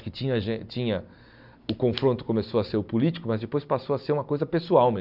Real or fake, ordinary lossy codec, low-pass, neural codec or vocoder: real; none; 5.4 kHz; none